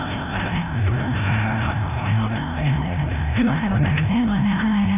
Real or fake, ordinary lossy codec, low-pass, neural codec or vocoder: fake; none; 3.6 kHz; codec, 16 kHz, 0.5 kbps, FreqCodec, larger model